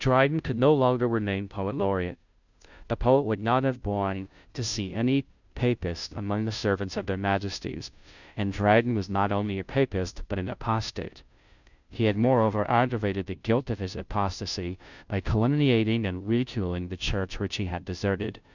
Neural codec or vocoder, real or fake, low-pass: codec, 16 kHz, 0.5 kbps, FunCodec, trained on Chinese and English, 25 frames a second; fake; 7.2 kHz